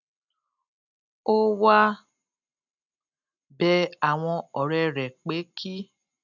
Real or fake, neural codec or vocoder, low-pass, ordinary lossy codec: real; none; 7.2 kHz; none